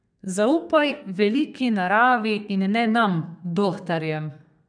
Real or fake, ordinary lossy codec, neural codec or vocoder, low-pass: fake; none; codec, 32 kHz, 1.9 kbps, SNAC; 9.9 kHz